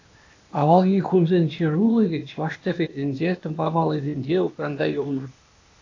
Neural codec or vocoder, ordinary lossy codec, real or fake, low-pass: codec, 16 kHz, 0.8 kbps, ZipCodec; AAC, 48 kbps; fake; 7.2 kHz